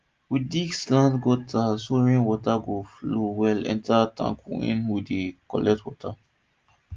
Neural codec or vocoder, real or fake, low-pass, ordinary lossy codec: none; real; 7.2 kHz; Opus, 32 kbps